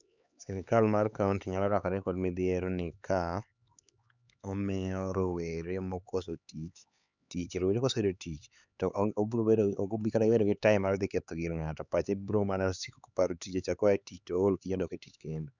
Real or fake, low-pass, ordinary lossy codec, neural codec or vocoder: fake; 7.2 kHz; none; codec, 16 kHz, 4 kbps, X-Codec, HuBERT features, trained on LibriSpeech